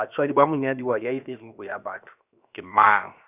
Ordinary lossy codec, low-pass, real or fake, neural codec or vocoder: none; 3.6 kHz; fake; codec, 16 kHz, 0.7 kbps, FocalCodec